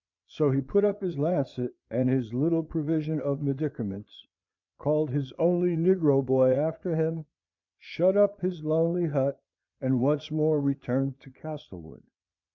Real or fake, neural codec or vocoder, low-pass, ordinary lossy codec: fake; vocoder, 22.05 kHz, 80 mel bands, Vocos; 7.2 kHz; MP3, 64 kbps